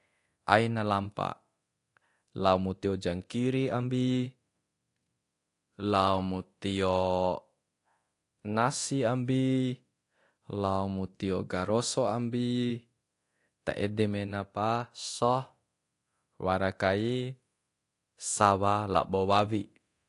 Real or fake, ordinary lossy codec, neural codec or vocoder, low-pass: fake; AAC, 48 kbps; codec, 24 kHz, 0.9 kbps, DualCodec; 10.8 kHz